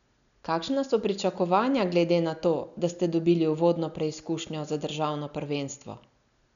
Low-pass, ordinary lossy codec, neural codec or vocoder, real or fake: 7.2 kHz; none; none; real